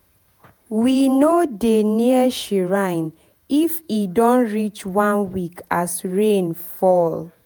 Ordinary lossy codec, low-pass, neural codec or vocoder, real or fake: none; none; vocoder, 48 kHz, 128 mel bands, Vocos; fake